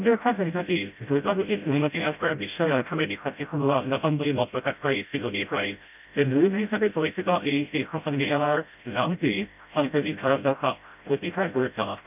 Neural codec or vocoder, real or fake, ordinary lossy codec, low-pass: codec, 16 kHz, 0.5 kbps, FreqCodec, smaller model; fake; none; 3.6 kHz